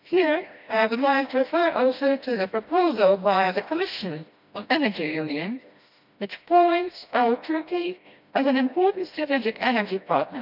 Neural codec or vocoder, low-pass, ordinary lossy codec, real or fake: codec, 16 kHz, 1 kbps, FreqCodec, smaller model; 5.4 kHz; none; fake